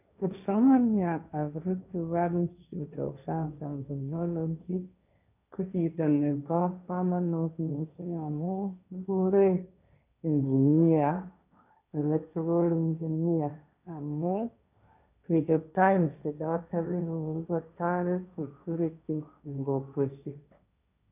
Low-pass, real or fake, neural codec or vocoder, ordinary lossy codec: 3.6 kHz; fake; codec, 16 kHz, 1.1 kbps, Voila-Tokenizer; none